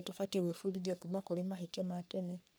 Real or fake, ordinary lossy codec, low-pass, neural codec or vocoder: fake; none; none; codec, 44.1 kHz, 3.4 kbps, Pupu-Codec